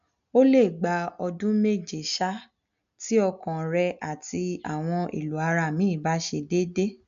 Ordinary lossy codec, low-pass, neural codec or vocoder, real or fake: AAC, 96 kbps; 7.2 kHz; none; real